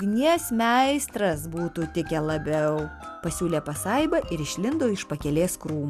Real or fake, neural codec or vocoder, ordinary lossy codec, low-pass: real; none; Opus, 64 kbps; 14.4 kHz